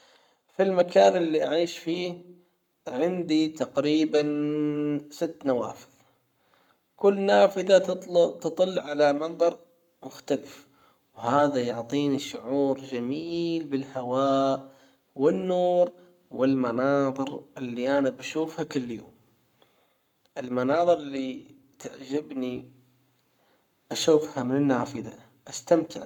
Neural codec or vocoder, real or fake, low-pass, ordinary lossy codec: codec, 44.1 kHz, 7.8 kbps, Pupu-Codec; fake; 19.8 kHz; none